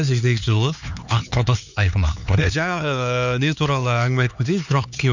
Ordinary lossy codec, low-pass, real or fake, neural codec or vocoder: none; 7.2 kHz; fake; codec, 16 kHz, 4 kbps, X-Codec, HuBERT features, trained on LibriSpeech